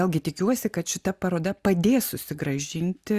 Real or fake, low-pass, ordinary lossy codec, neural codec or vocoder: real; 14.4 kHz; Opus, 64 kbps; none